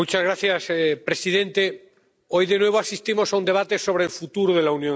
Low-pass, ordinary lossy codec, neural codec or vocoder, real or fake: none; none; none; real